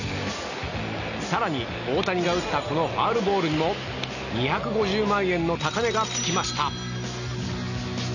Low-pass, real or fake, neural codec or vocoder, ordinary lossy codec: 7.2 kHz; real; none; none